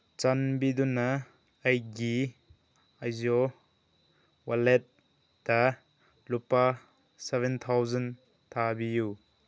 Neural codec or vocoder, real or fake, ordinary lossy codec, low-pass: none; real; none; none